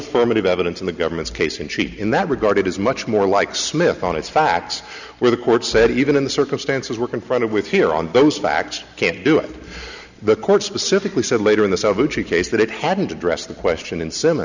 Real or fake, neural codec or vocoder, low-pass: real; none; 7.2 kHz